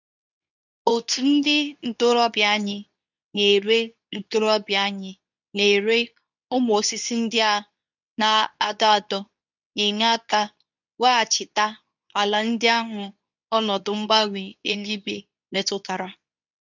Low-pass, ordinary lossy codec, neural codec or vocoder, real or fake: 7.2 kHz; none; codec, 24 kHz, 0.9 kbps, WavTokenizer, medium speech release version 2; fake